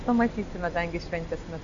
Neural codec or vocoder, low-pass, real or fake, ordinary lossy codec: none; 7.2 kHz; real; Opus, 64 kbps